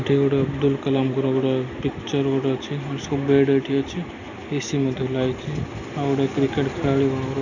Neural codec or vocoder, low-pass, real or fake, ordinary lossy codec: none; 7.2 kHz; real; none